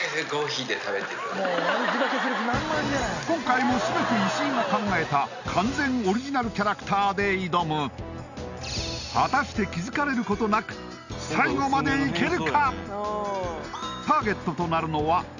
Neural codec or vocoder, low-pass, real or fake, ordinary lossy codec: none; 7.2 kHz; real; none